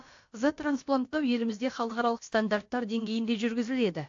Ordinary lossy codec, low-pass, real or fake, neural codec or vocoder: AAC, 48 kbps; 7.2 kHz; fake; codec, 16 kHz, about 1 kbps, DyCAST, with the encoder's durations